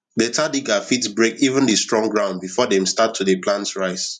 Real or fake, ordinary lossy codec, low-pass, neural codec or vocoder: fake; none; 10.8 kHz; vocoder, 44.1 kHz, 128 mel bands every 512 samples, BigVGAN v2